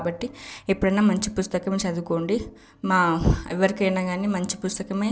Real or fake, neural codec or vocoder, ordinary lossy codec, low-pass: real; none; none; none